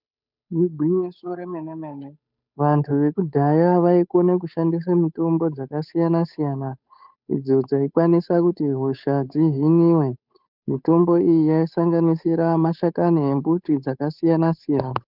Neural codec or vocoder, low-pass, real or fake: codec, 16 kHz, 8 kbps, FunCodec, trained on Chinese and English, 25 frames a second; 5.4 kHz; fake